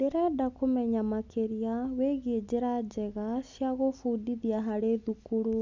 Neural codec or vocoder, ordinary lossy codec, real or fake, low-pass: none; MP3, 64 kbps; real; 7.2 kHz